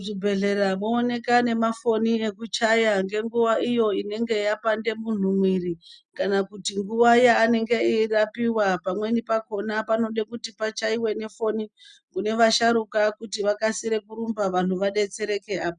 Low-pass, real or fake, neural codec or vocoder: 9.9 kHz; real; none